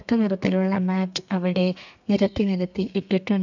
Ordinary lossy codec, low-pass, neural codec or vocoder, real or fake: none; 7.2 kHz; codec, 32 kHz, 1.9 kbps, SNAC; fake